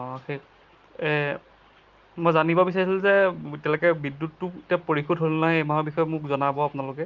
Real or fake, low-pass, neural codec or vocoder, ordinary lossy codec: real; 7.2 kHz; none; Opus, 32 kbps